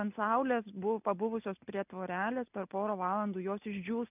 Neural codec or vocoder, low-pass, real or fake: none; 3.6 kHz; real